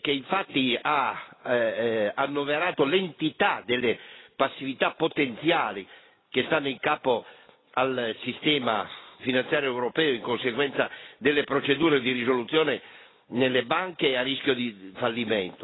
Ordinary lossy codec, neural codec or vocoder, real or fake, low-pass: AAC, 16 kbps; none; real; 7.2 kHz